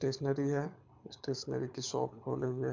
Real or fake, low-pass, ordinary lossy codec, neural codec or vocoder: fake; 7.2 kHz; MP3, 64 kbps; codec, 24 kHz, 6 kbps, HILCodec